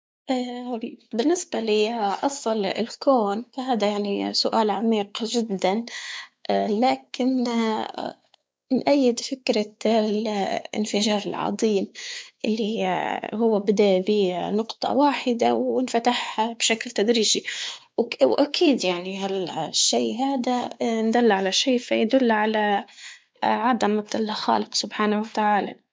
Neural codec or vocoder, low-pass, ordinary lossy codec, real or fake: codec, 16 kHz, 4 kbps, X-Codec, WavLM features, trained on Multilingual LibriSpeech; none; none; fake